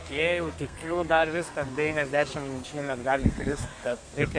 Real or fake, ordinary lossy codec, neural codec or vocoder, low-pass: fake; MP3, 64 kbps; codec, 32 kHz, 1.9 kbps, SNAC; 9.9 kHz